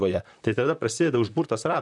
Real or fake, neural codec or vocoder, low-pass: fake; vocoder, 44.1 kHz, 128 mel bands, Pupu-Vocoder; 10.8 kHz